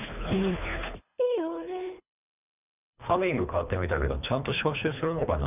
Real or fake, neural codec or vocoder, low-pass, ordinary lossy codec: fake; codec, 24 kHz, 3 kbps, HILCodec; 3.6 kHz; none